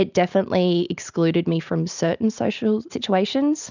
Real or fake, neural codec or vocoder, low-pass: real; none; 7.2 kHz